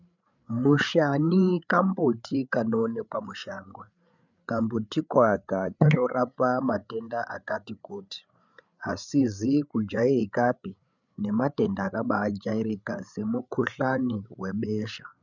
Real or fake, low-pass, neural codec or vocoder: fake; 7.2 kHz; codec, 16 kHz, 8 kbps, FreqCodec, larger model